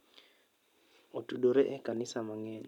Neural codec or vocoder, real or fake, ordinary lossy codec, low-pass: none; real; none; 19.8 kHz